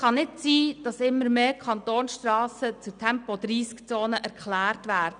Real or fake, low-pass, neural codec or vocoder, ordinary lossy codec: real; 9.9 kHz; none; none